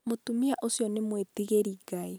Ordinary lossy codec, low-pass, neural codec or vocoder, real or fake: none; none; none; real